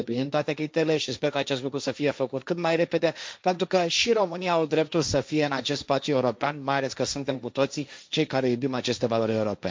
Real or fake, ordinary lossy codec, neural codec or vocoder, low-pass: fake; none; codec, 16 kHz, 1.1 kbps, Voila-Tokenizer; none